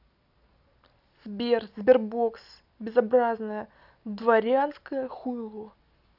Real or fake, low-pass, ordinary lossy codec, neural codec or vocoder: real; 5.4 kHz; none; none